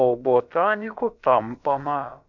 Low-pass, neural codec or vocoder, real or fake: 7.2 kHz; codec, 16 kHz, about 1 kbps, DyCAST, with the encoder's durations; fake